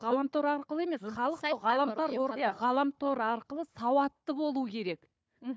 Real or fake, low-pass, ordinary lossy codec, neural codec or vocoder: fake; none; none; codec, 16 kHz, 4 kbps, FunCodec, trained on Chinese and English, 50 frames a second